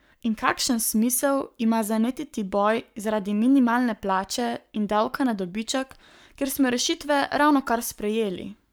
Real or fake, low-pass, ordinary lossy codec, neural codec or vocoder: fake; none; none; codec, 44.1 kHz, 7.8 kbps, Pupu-Codec